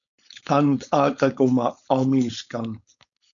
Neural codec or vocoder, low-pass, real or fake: codec, 16 kHz, 4.8 kbps, FACodec; 7.2 kHz; fake